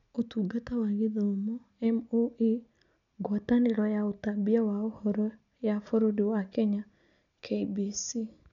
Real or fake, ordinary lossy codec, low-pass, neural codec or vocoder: real; none; 7.2 kHz; none